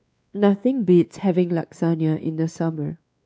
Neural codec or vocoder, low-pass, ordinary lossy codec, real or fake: codec, 16 kHz, 2 kbps, X-Codec, WavLM features, trained on Multilingual LibriSpeech; none; none; fake